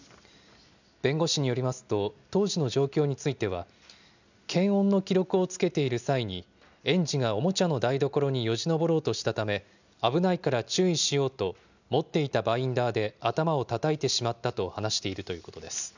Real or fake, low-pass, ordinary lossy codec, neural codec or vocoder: real; 7.2 kHz; none; none